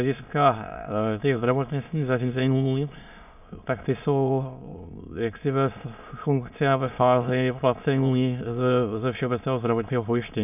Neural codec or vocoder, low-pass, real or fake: autoencoder, 22.05 kHz, a latent of 192 numbers a frame, VITS, trained on many speakers; 3.6 kHz; fake